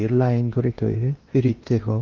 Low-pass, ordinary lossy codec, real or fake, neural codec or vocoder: 7.2 kHz; Opus, 24 kbps; fake; codec, 16 kHz in and 24 kHz out, 0.8 kbps, FocalCodec, streaming, 65536 codes